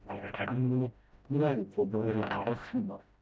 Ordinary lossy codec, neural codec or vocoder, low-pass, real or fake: none; codec, 16 kHz, 0.5 kbps, FreqCodec, smaller model; none; fake